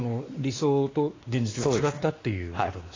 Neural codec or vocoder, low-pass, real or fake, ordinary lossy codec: codec, 16 kHz, 2 kbps, X-Codec, WavLM features, trained on Multilingual LibriSpeech; 7.2 kHz; fake; AAC, 32 kbps